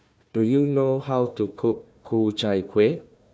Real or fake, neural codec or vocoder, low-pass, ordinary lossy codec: fake; codec, 16 kHz, 1 kbps, FunCodec, trained on Chinese and English, 50 frames a second; none; none